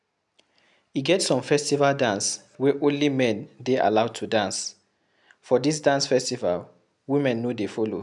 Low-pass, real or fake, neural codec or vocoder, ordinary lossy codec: 10.8 kHz; real; none; none